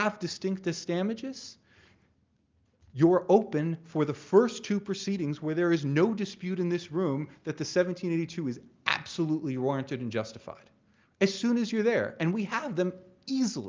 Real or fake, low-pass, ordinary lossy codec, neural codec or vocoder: real; 7.2 kHz; Opus, 24 kbps; none